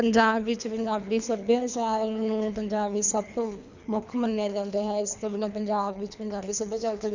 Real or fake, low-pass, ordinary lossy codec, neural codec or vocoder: fake; 7.2 kHz; none; codec, 24 kHz, 3 kbps, HILCodec